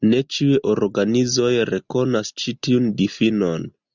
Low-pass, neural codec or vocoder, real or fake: 7.2 kHz; none; real